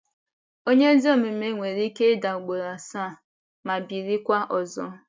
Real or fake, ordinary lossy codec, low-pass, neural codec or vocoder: real; none; none; none